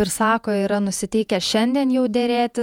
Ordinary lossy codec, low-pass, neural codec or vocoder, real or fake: MP3, 96 kbps; 19.8 kHz; vocoder, 48 kHz, 128 mel bands, Vocos; fake